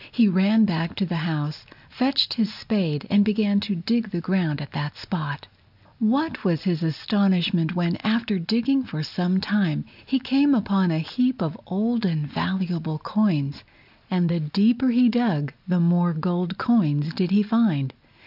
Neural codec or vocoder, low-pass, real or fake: none; 5.4 kHz; real